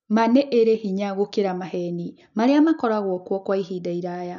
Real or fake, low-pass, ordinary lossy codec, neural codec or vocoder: real; 7.2 kHz; none; none